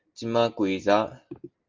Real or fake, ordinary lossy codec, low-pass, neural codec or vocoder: real; Opus, 24 kbps; 7.2 kHz; none